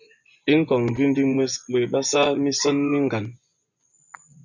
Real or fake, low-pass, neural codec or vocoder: fake; 7.2 kHz; vocoder, 24 kHz, 100 mel bands, Vocos